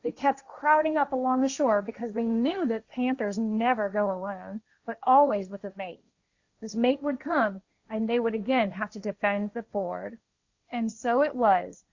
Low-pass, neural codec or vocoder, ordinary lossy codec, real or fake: 7.2 kHz; codec, 16 kHz, 1.1 kbps, Voila-Tokenizer; Opus, 64 kbps; fake